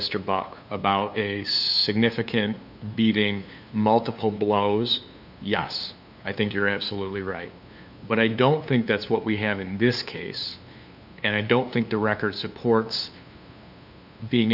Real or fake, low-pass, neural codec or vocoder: fake; 5.4 kHz; codec, 16 kHz, 2 kbps, FunCodec, trained on LibriTTS, 25 frames a second